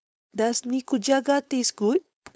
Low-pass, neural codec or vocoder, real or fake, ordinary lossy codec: none; codec, 16 kHz, 4.8 kbps, FACodec; fake; none